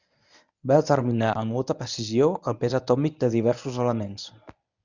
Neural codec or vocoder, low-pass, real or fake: codec, 24 kHz, 0.9 kbps, WavTokenizer, medium speech release version 1; 7.2 kHz; fake